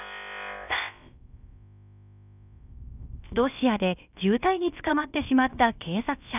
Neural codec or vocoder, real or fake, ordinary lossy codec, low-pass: codec, 16 kHz, about 1 kbps, DyCAST, with the encoder's durations; fake; none; 3.6 kHz